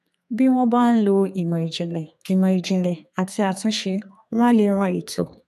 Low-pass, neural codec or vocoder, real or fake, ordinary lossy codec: 14.4 kHz; codec, 32 kHz, 1.9 kbps, SNAC; fake; none